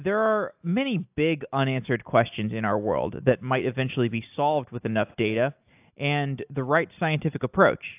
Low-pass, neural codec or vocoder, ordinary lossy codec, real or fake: 3.6 kHz; none; AAC, 32 kbps; real